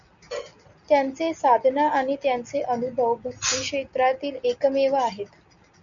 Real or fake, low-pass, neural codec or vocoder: real; 7.2 kHz; none